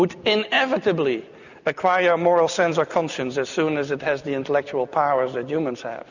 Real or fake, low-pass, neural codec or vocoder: real; 7.2 kHz; none